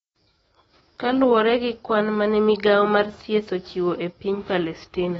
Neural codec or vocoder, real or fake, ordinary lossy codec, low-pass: autoencoder, 48 kHz, 128 numbers a frame, DAC-VAE, trained on Japanese speech; fake; AAC, 24 kbps; 19.8 kHz